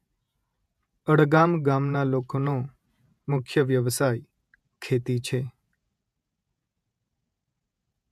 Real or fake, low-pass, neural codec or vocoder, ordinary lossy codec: fake; 14.4 kHz; vocoder, 48 kHz, 128 mel bands, Vocos; MP3, 96 kbps